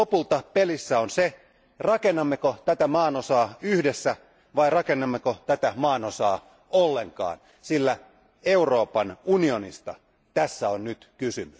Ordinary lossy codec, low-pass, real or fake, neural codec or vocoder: none; none; real; none